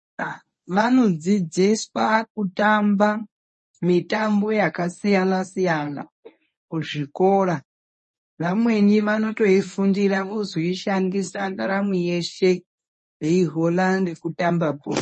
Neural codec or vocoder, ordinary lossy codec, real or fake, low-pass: codec, 24 kHz, 0.9 kbps, WavTokenizer, medium speech release version 1; MP3, 32 kbps; fake; 10.8 kHz